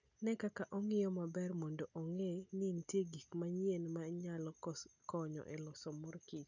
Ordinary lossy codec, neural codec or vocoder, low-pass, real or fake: none; none; 7.2 kHz; real